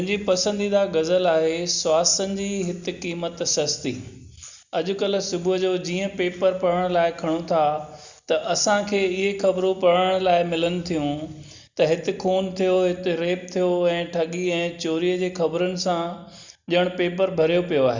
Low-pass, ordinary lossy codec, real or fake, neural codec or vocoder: 7.2 kHz; Opus, 64 kbps; real; none